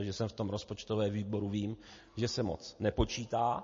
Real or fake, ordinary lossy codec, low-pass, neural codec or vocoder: real; MP3, 32 kbps; 7.2 kHz; none